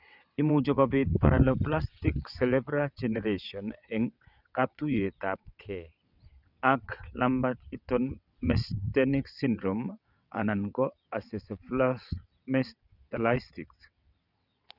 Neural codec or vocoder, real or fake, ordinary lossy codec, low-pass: vocoder, 22.05 kHz, 80 mel bands, WaveNeXt; fake; none; 5.4 kHz